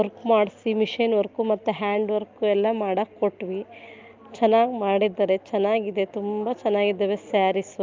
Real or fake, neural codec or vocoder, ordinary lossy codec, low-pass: real; none; Opus, 24 kbps; 7.2 kHz